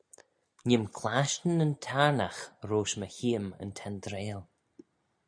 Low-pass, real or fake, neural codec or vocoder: 9.9 kHz; real; none